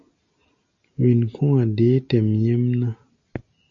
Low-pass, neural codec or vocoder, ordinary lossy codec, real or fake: 7.2 kHz; none; AAC, 64 kbps; real